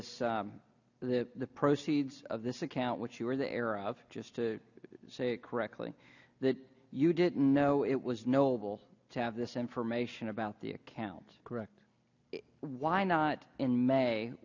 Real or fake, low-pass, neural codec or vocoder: real; 7.2 kHz; none